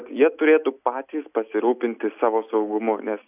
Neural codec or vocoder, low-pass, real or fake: none; 3.6 kHz; real